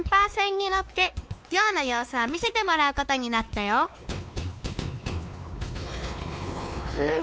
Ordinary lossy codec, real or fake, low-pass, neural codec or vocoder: none; fake; none; codec, 16 kHz, 2 kbps, X-Codec, WavLM features, trained on Multilingual LibriSpeech